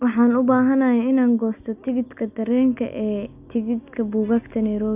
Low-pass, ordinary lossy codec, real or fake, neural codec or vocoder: 3.6 kHz; AAC, 32 kbps; real; none